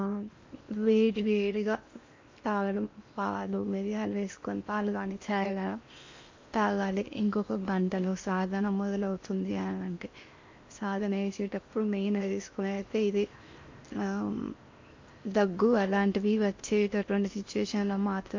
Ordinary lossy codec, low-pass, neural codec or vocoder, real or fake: MP3, 48 kbps; 7.2 kHz; codec, 16 kHz in and 24 kHz out, 0.8 kbps, FocalCodec, streaming, 65536 codes; fake